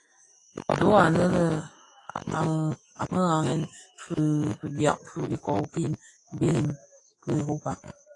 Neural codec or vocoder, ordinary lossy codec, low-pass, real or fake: autoencoder, 48 kHz, 128 numbers a frame, DAC-VAE, trained on Japanese speech; AAC, 32 kbps; 10.8 kHz; fake